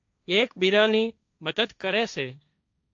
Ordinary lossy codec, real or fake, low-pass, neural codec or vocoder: AAC, 64 kbps; fake; 7.2 kHz; codec, 16 kHz, 1.1 kbps, Voila-Tokenizer